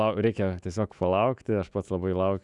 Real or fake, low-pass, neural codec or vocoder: fake; 10.8 kHz; autoencoder, 48 kHz, 128 numbers a frame, DAC-VAE, trained on Japanese speech